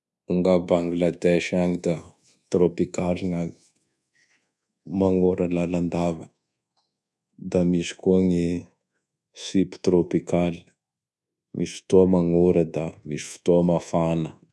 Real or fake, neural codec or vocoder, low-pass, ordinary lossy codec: fake; codec, 24 kHz, 1.2 kbps, DualCodec; none; none